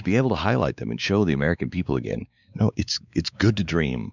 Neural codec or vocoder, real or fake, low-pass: codec, 16 kHz, 4 kbps, X-Codec, WavLM features, trained on Multilingual LibriSpeech; fake; 7.2 kHz